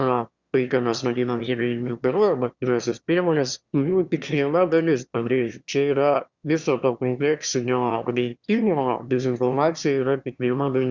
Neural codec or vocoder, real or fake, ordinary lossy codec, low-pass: autoencoder, 22.05 kHz, a latent of 192 numbers a frame, VITS, trained on one speaker; fake; Opus, 64 kbps; 7.2 kHz